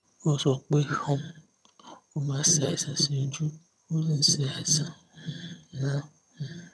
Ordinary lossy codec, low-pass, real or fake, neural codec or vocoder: none; none; fake; vocoder, 22.05 kHz, 80 mel bands, HiFi-GAN